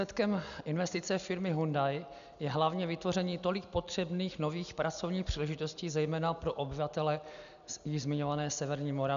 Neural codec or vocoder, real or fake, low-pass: none; real; 7.2 kHz